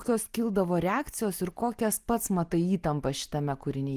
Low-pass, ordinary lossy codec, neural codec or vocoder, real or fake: 14.4 kHz; Opus, 24 kbps; none; real